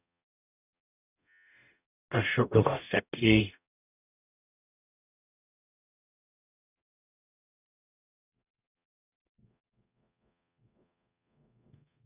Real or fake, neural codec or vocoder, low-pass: fake; codec, 44.1 kHz, 0.9 kbps, DAC; 3.6 kHz